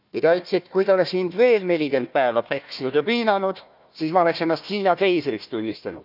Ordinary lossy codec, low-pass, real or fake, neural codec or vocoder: none; 5.4 kHz; fake; codec, 16 kHz, 1 kbps, FunCodec, trained on Chinese and English, 50 frames a second